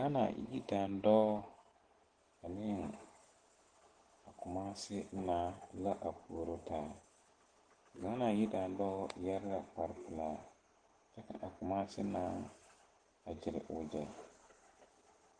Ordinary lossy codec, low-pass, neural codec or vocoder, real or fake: Opus, 16 kbps; 9.9 kHz; none; real